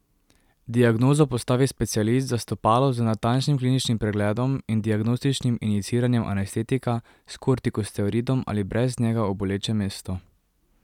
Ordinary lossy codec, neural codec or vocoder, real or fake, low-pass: none; none; real; 19.8 kHz